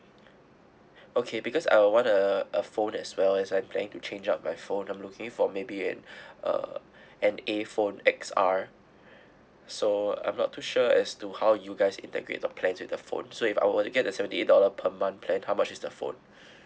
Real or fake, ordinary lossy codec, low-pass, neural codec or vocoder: real; none; none; none